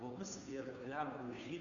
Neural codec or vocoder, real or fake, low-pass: codec, 16 kHz, 2 kbps, FunCodec, trained on Chinese and English, 25 frames a second; fake; 7.2 kHz